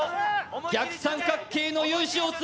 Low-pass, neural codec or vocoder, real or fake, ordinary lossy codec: none; none; real; none